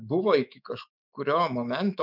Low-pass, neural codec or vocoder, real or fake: 5.4 kHz; autoencoder, 48 kHz, 128 numbers a frame, DAC-VAE, trained on Japanese speech; fake